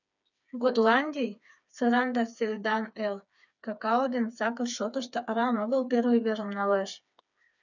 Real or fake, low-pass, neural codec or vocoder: fake; 7.2 kHz; codec, 16 kHz, 4 kbps, FreqCodec, smaller model